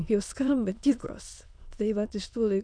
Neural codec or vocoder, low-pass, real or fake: autoencoder, 22.05 kHz, a latent of 192 numbers a frame, VITS, trained on many speakers; 9.9 kHz; fake